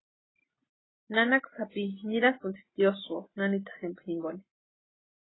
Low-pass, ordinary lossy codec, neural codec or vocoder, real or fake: 7.2 kHz; AAC, 16 kbps; none; real